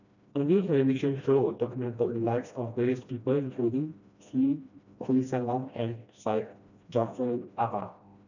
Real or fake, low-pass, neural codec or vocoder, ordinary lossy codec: fake; 7.2 kHz; codec, 16 kHz, 1 kbps, FreqCodec, smaller model; none